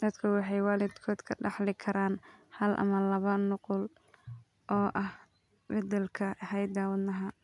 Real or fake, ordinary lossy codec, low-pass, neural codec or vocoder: real; none; 10.8 kHz; none